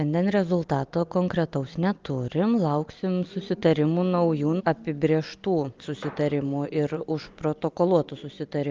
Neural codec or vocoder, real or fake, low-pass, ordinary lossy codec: none; real; 7.2 kHz; Opus, 32 kbps